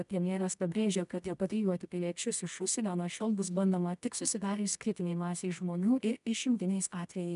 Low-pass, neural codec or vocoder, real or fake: 10.8 kHz; codec, 24 kHz, 0.9 kbps, WavTokenizer, medium music audio release; fake